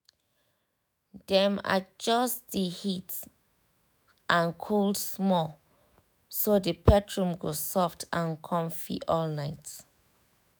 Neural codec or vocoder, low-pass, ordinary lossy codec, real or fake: autoencoder, 48 kHz, 128 numbers a frame, DAC-VAE, trained on Japanese speech; none; none; fake